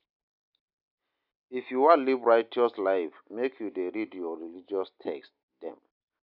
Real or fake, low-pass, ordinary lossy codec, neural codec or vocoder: real; 5.4 kHz; none; none